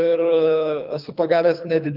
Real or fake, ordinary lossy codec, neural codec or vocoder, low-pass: fake; Opus, 24 kbps; codec, 24 kHz, 3 kbps, HILCodec; 5.4 kHz